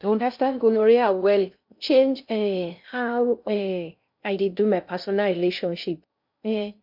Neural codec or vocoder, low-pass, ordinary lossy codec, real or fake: codec, 16 kHz in and 24 kHz out, 0.6 kbps, FocalCodec, streaming, 2048 codes; 5.4 kHz; MP3, 48 kbps; fake